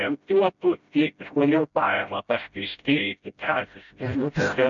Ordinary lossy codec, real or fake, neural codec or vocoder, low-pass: MP3, 64 kbps; fake; codec, 16 kHz, 0.5 kbps, FreqCodec, smaller model; 7.2 kHz